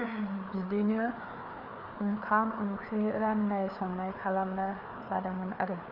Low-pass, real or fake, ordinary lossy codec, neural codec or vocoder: 5.4 kHz; fake; none; codec, 16 kHz, 4 kbps, FreqCodec, larger model